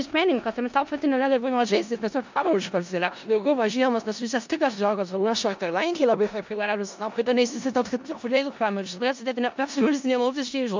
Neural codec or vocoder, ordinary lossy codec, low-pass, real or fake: codec, 16 kHz in and 24 kHz out, 0.4 kbps, LongCat-Audio-Codec, four codebook decoder; MP3, 64 kbps; 7.2 kHz; fake